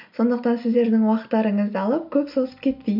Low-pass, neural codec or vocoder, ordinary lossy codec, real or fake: 5.4 kHz; none; none; real